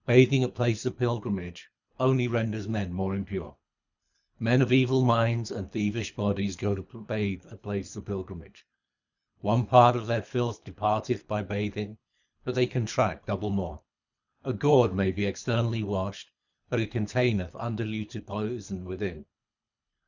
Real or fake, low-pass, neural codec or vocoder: fake; 7.2 kHz; codec, 24 kHz, 3 kbps, HILCodec